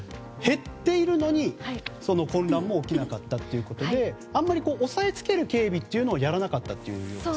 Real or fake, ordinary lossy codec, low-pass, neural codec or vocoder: real; none; none; none